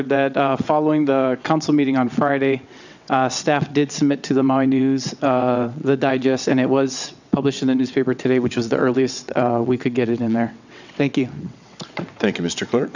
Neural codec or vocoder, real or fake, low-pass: vocoder, 22.05 kHz, 80 mel bands, WaveNeXt; fake; 7.2 kHz